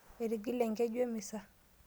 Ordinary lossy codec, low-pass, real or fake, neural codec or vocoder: none; none; real; none